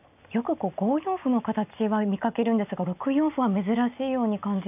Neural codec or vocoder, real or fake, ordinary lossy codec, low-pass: none; real; none; 3.6 kHz